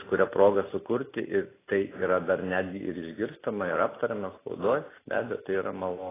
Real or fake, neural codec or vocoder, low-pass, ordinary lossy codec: real; none; 3.6 kHz; AAC, 16 kbps